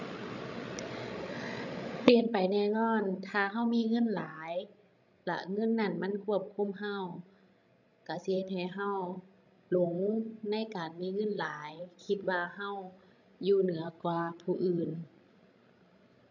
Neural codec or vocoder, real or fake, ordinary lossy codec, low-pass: codec, 16 kHz, 16 kbps, FreqCodec, larger model; fake; none; 7.2 kHz